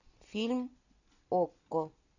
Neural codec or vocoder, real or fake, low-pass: none; real; 7.2 kHz